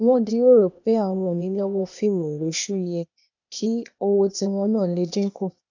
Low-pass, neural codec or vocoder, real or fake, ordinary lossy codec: 7.2 kHz; codec, 16 kHz, 0.8 kbps, ZipCodec; fake; none